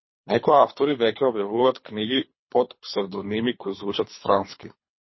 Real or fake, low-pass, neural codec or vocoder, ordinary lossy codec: fake; 7.2 kHz; codec, 24 kHz, 3 kbps, HILCodec; MP3, 24 kbps